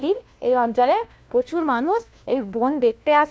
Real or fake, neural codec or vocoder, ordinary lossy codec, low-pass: fake; codec, 16 kHz, 1 kbps, FunCodec, trained on LibriTTS, 50 frames a second; none; none